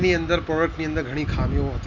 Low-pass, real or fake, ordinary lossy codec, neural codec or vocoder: 7.2 kHz; real; none; none